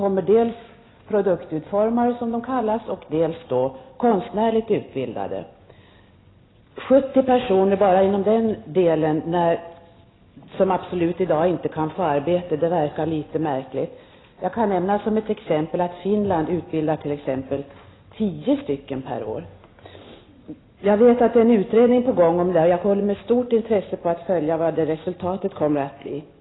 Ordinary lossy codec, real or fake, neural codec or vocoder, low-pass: AAC, 16 kbps; real; none; 7.2 kHz